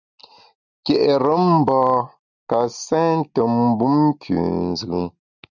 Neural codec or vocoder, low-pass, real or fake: none; 7.2 kHz; real